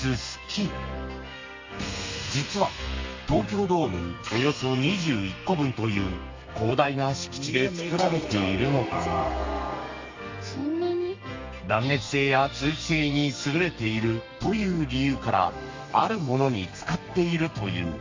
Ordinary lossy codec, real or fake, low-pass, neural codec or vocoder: MP3, 48 kbps; fake; 7.2 kHz; codec, 32 kHz, 1.9 kbps, SNAC